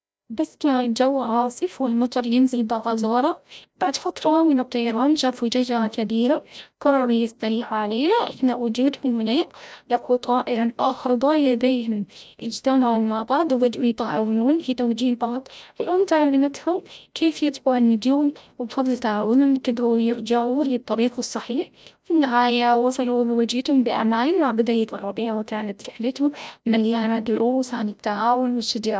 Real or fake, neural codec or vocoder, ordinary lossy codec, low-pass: fake; codec, 16 kHz, 0.5 kbps, FreqCodec, larger model; none; none